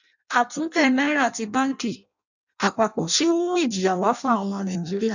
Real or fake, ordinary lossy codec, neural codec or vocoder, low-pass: fake; none; codec, 16 kHz in and 24 kHz out, 0.6 kbps, FireRedTTS-2 codec; 7.2 kHz